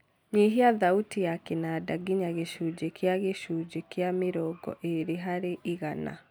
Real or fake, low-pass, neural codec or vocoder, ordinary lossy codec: real; none; none; none